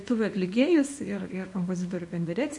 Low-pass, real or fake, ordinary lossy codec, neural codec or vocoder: 10.8 kHz; fake; MP3, 48 kbps; codec, 24 kHz, 0.9 kbps, WavTokenizer, small release